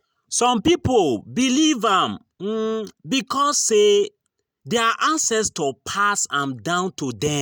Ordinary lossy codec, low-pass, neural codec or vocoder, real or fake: none; none; none; real